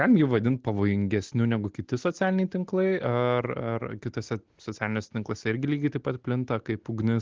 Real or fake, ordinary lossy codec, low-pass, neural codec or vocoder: real; Opus, 16 kbps; 7.2 kHz; none